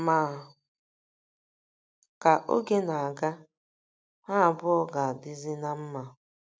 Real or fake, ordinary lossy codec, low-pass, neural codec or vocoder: real; none; none; none